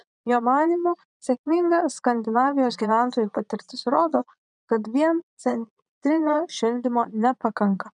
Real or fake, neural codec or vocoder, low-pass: fake; vocoder, 22.05 kHz, 80 mel bands, Vocos; 9.9 kHz